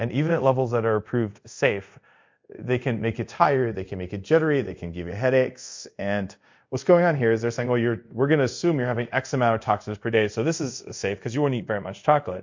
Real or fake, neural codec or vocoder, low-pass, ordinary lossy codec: fake; codec, 24 kHz, 0.5 kbps, DualCodec; 7.2 kHz; MP3, 48 kbps